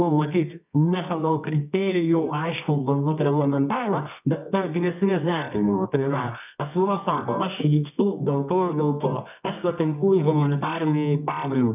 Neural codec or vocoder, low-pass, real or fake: codec, 24 kHz, 0.9 kbps, WavTokenizer, medium music audio release; 3.6 kHz; fake